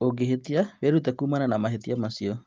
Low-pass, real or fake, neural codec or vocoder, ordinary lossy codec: 7.2 kHz; real; none; Opus, 32 kbps